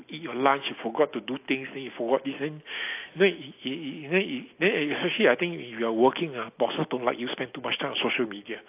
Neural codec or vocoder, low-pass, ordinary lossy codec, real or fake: none; 3.6 kHz; AAC, 32 kbps; real